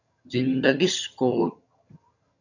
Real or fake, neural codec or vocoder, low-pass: fake; vocoder, 22.05 kHz, 80 mel bands, HiFi-GAN; 7.2 kHz